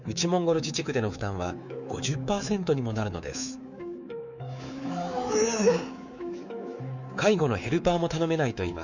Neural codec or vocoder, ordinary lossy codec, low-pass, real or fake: codec, 24 kHz, 3.1 kbps, DualCodec; none; 7.2 kHz; fake